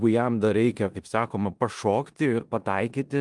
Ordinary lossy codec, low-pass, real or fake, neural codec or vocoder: Opus, 32 kbps; 10.8 kHz; fake; codec, 16 kHz in and 24 kHz out, 0.9 kbps, LongCat-Audio-Codec, four codebook decoder